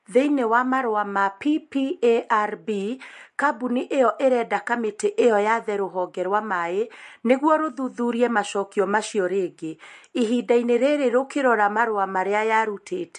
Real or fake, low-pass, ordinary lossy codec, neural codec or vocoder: real; 14.4 kHz; MP3, 48 kbps; none